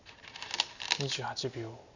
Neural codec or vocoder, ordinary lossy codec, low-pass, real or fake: none; none; 7.2 kHz; real